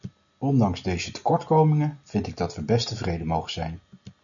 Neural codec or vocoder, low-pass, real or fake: none; 7.2 kHz; real